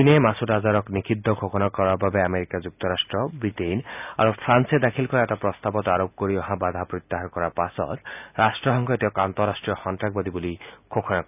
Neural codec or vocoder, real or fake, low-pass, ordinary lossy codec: none; real; 3.6 kHz; none